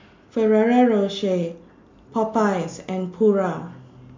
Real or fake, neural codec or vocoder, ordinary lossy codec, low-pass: real; none; MP3, 48 kbps; 7.2 kHz